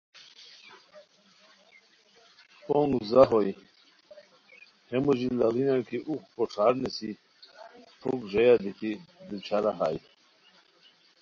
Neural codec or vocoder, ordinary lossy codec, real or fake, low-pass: none; MP3, 32 kbps; real; 7.2 kHz